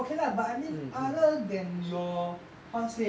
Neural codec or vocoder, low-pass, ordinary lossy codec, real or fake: none; none; none; real